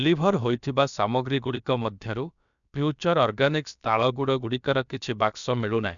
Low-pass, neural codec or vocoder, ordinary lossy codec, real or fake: 7.2 kHz; codec, 16 kHz, about 1 kbps, DyCAST, with the encoder's durations; none; fake